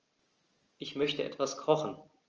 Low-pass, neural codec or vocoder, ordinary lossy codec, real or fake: 7.2 kHz; none; Opus, 24 kbps; real